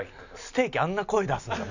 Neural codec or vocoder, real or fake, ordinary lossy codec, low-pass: none; real; none; 7.2 kHz